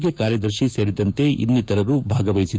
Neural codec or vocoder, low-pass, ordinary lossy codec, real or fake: codec, 16 kHz, 6 kbps, DAC; none; none; fake